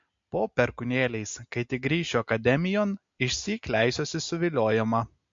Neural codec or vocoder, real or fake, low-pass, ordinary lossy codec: none; real; 7.2 kHz; MP3, 48 kbps